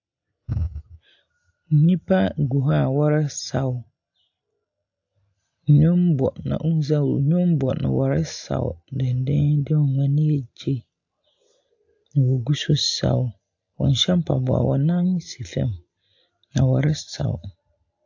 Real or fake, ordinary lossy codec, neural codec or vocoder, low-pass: real; AAC, 48 kbps; none; 7.2 kHz